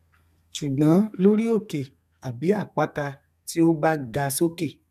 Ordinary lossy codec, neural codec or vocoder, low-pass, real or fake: none; codec, 32 kHz, 1.9 kbps, SNAC; 14.4 kHz; fake